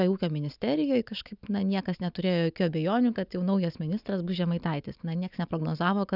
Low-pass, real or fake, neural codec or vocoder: 5.4 kHz; real; none